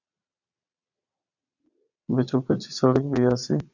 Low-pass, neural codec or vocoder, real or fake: 7.2 kHz; vocoder, 22.05 kHz, 80 mel bands, Vocos; fake